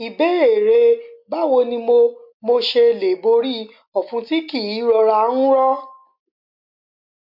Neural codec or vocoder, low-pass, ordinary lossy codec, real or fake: none; 5.4 kHz; MP3, 48 kbps; real